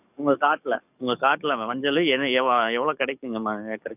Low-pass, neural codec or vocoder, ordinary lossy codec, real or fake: 3.6 kHz; codec, 44.1 kHz, 7.8 kbps, DAC; none; fake